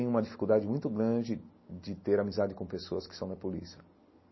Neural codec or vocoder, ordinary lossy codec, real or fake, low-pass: none; MP3, 24 kbps; real; 7.2 kHz